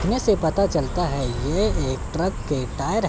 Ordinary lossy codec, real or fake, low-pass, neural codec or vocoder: none; real; none; none